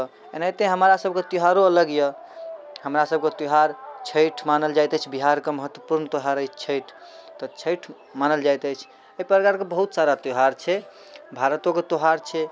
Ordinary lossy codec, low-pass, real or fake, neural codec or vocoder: none; none; real; none